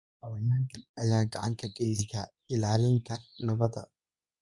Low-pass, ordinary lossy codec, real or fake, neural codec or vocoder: 10.8 kHz; none; fake; codec, 24 kHz, 0.9 kbps, WavTokenizer, medium speech release version 2